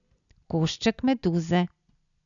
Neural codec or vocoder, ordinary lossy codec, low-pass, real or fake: none; none; 7.2 kHz; real